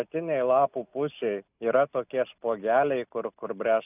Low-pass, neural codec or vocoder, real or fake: 3.6 kHz; none; real